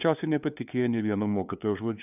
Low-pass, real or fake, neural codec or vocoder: 3.6 kHz; fake; codec, 16 kHz, 2 kbps, FunCodec, trained on LibriTTS, 25 frames a second